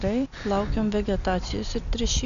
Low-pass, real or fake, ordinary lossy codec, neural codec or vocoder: 7.2 kHz; real; MP3, 96 kbps; none